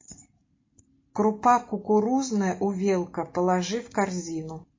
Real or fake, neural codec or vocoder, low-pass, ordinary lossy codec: real; none; 7.2 kHz; MP3, 32 kbps